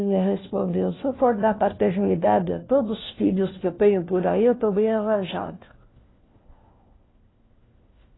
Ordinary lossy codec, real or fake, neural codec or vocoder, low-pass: AAC, 16 kbps; fake; codec, 16 kHz, 1 kbps, FunCodec, trained on LibriTTS, 50 frames a second; 7.2 kHz